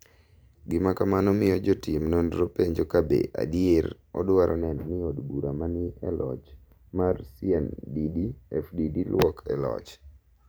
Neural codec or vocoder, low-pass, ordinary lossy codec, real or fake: vocoder, 44.1 kHz, 128 mel bands every 512 samples, BigVGAN v2; none; none; fake